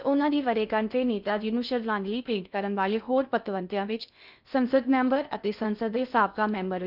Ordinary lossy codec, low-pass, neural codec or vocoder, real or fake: none; 5.4 kHz; codec, 16 kHz in and 24 kHz out, 0.6 kbps, FocalCodec, streaming, 2048 codes; fake